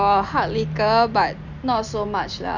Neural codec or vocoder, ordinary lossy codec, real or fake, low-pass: none; none; real; 7.2 kHz